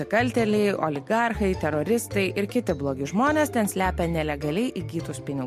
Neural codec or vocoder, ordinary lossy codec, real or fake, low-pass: none; MP3, 64 kbps; real; 14.4 kHz